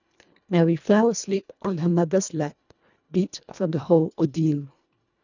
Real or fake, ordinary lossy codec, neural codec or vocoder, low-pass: fake; none; codec, 24 kHz, 1.5 kbps, HILCodec; 7.2 kHz